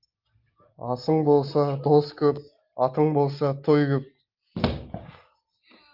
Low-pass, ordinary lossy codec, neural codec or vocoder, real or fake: 5.4 kHz; Opus, 24 kbps; none; real